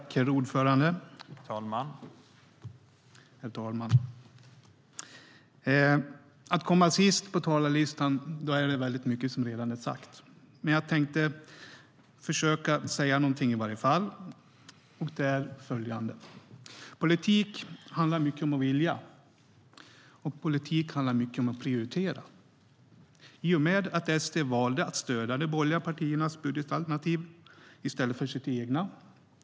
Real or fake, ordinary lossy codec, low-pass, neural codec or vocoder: real; none; none; none